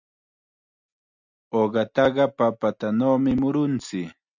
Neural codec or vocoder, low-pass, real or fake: none; 7.2 kHz; real